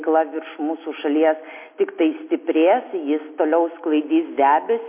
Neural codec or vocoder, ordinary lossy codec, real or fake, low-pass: none; MP3, 24 kbps; real; 3.6 kHz